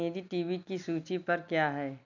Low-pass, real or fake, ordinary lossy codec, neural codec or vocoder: 7.2 kHz; real; none; none